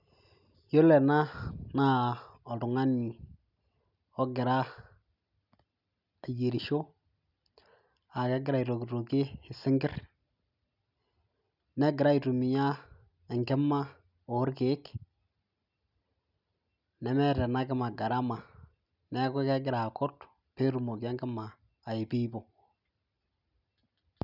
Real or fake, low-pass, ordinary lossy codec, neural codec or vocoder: real; 5.4 kHz; none; none